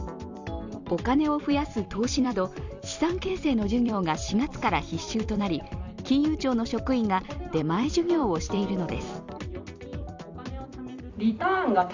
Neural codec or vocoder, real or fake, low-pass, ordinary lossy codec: vocoder, 44.1 kHz, 128 mel bands every 256 samples, BigVGAN v2; fake; 7.2 kHz; Opus, 64 kbps